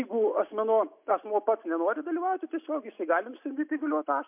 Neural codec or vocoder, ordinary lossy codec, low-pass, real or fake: none; MP3, 32 kbps; 3.6 kHz; real